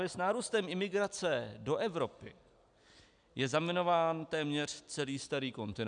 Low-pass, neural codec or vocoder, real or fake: 9.9 kHz; none; real